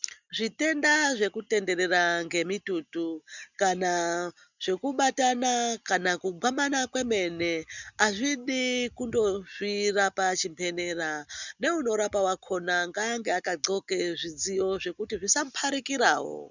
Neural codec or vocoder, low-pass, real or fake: none; 7.2 kHz; real